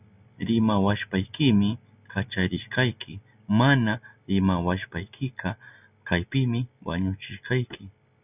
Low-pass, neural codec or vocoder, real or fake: 3.6 kHz; none; real